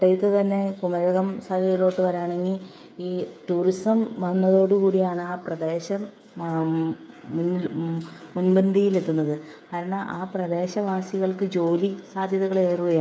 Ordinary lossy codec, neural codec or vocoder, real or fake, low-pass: none; codec, 16 kHz, 8 kbps, FreqCodec, smaller model; fake; none